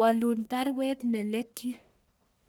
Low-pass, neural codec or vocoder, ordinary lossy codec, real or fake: none; codec, 44.1 kHz, 1.7 kbps, Pupu-Codec; none; fake